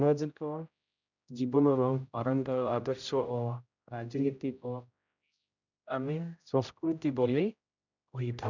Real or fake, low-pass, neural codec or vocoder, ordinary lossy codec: fake; 7.2 kHz; codec, 16 kHz, 0.5 kbps, X-Codec, HuBERT features, trained on general audio; none